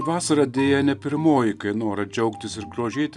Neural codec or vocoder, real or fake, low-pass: none; real; 10.8 kHz